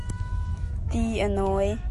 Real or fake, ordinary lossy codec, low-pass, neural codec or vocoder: fake; MP3, 48 kbps; 14.4 kHz; autoencoder, 48 kHz, 128 numbers a frame, DAC-VAE, trained on Japanese speech